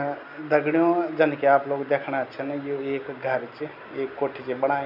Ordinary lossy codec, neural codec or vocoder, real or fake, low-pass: none; none; real; 5.4 kHz